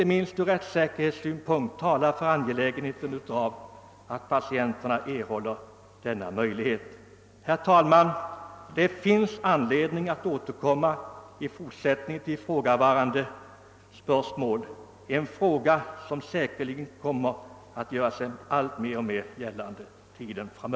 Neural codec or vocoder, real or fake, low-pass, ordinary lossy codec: none; real; none; none